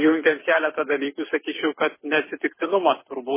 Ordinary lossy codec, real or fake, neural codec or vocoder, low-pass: MP3, 16 kbps; fake; codec, 24 kHz, 6 kbps, HILCodec; 3.6 kHz